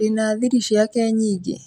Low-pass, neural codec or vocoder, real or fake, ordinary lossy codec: 19.8 kHz; none; real; none